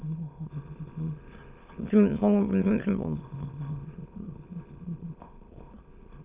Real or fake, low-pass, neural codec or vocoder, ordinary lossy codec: fake; 3.6 kHz; autoencoder, 22.05 kHz, a latent of 192 numbers a frame, VITS, trained on many speakers; Opus, 24 kbps